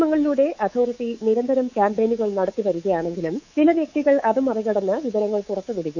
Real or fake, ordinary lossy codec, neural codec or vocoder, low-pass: fake; none; codec, 24 kHz, 3.1 kbps, DualCodec; 7.2 kHz